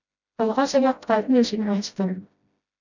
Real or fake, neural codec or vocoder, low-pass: fake; codec, 16 kHz, 0.5 kbps, FreqCodec, smaller model; 7.2 kHz